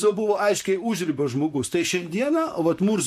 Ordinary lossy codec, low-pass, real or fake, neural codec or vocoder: MP3, 64 kbps; 14.4 kHz; fake; vocoder, 44.1 kHz, 128 mel bands, Pupu-Vocoder